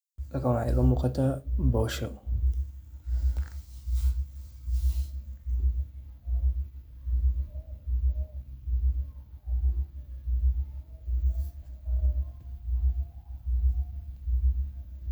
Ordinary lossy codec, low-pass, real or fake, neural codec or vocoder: none; none; real; none